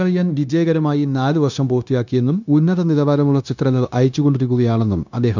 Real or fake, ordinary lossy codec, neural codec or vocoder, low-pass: fake; none; codec, 16 kHz, 0.9 kbps, LongCat-Audio-Codec; 7.2 kHz